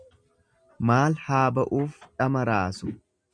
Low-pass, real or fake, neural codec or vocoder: 9.9 kHz; real; none